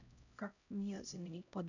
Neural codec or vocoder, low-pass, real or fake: codec, 16 kHz, 0.5 kbps, X-Codec, HuBERT features, trained on LibriSpeech; 7.2 kHz; fake